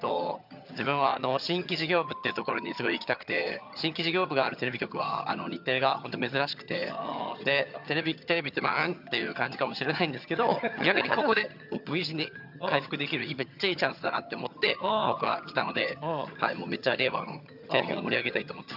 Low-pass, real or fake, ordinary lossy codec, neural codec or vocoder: 5.4 kHz; fake; none; vocoder, 22.05 kHz, 80 mel bands, HiFi-GAN